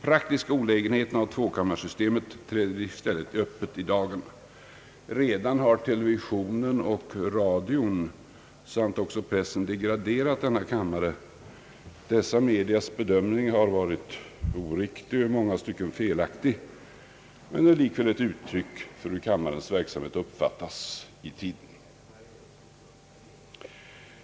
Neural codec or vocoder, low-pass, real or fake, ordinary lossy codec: none; none; real; none